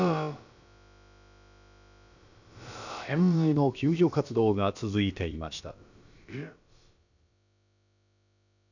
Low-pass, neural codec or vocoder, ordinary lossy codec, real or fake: 7.2 kHz; codec, 16 kHz, about 1 kbps, DyCAST, with the encoder's durations; none; fake